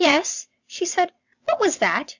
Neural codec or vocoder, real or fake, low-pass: vocoder, 22.05 kHz, 80 mel bands, WaveNeXt; fake; 7.2 kHz